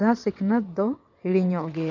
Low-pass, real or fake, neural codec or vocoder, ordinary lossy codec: 7.2 kHz; fake; vocoder, 22.05 kHz, 80 mel bands, WaveNeXt; none